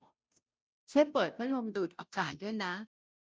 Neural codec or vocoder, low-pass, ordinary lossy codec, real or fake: codec, 16 kHz, 0.5 kbps, FunCodec, trained on Chinese and English, 25 frames a second; none; none; fake